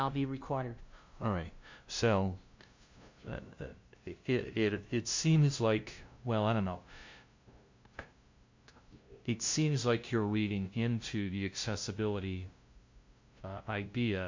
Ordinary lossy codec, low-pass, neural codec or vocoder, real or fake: MP3, 64 kbps; 7.2 kHz; codec, 16 kHz, 0.5 kbps, FunCodec, trained on LibriTTS, 25 frames a second; fake